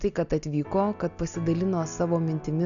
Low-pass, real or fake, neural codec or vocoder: 7.2 kHz; real; none